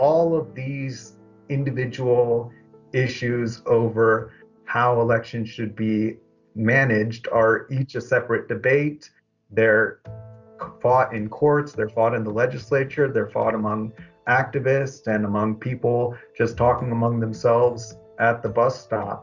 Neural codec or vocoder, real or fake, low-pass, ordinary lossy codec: none; real; 7.2 kHz; Opus, 64 kbps